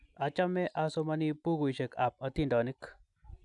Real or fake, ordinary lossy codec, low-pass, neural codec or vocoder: real; none; 10.8 kHz; none